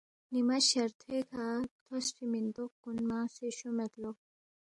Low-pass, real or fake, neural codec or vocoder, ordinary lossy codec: 10.8 kHz; real; none; MP3, 64 kbps